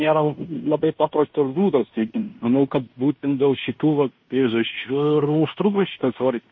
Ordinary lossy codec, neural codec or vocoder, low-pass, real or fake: MP3, 32 kbps; codec, 16 kHz in and 24 kHz out, 0.9 kbps, LongCat-Audio-Codec, fine tuned four codebook decoder; 7.2 kHz; fake